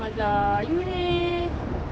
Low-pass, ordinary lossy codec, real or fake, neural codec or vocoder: none; none; fake; codec, 16 kHz, 4 kbps, X-Codec, HuBERT features, trained on general audio